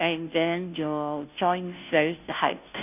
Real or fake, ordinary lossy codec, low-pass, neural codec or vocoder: fake; none; 3.6 kHz; codec, 16 kHz, 0.5 kbps, FunCodec, trained on Chinese and English, 25 frames a second